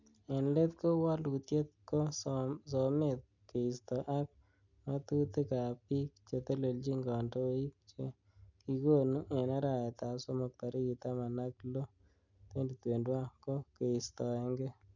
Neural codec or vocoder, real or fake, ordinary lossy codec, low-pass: none; real; none; 7.2 kHz